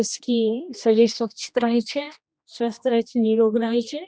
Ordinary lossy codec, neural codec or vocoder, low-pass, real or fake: none; codec, 16 kHz, 1 kbps, X-Codec, HuBERT features, trained on general audio; none; fake